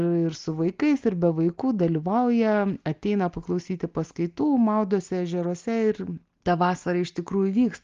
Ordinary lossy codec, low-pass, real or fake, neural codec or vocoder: Opus, 32 kbps; 7.2 kHz; real; none